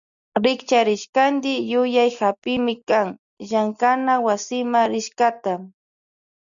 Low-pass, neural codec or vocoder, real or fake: 7.2 kHz; none; real